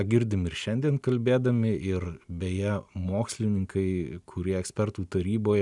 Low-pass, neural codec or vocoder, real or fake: 10.8 kHz; none; real